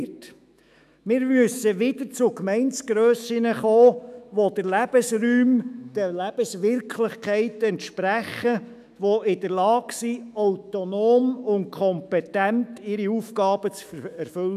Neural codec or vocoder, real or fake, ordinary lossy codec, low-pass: autoencoder, 48 kHz, 128 numbers a frame, DAC-VAE, trained on Japanese speech; fake; none; 14.4 kHz